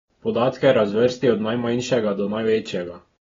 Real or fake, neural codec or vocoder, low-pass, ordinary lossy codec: real; none; 7.2 kHz; AAC, 24 kbps